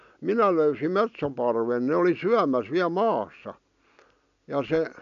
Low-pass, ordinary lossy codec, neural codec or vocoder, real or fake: 7.2 kHz; none; none; real